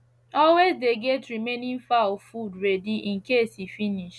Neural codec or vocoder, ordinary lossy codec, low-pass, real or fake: none; none; none; real